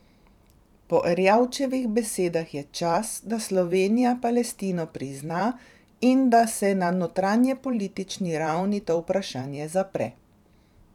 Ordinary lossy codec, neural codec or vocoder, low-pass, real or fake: none; vocoder, 44.1 kHz, 128 mel bands every 512 samples, BigVGAN v2; 19.8 kHz; fake